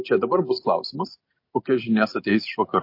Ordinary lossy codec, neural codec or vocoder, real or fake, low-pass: MP3, 32 kbps; none; real; 5.4 kHz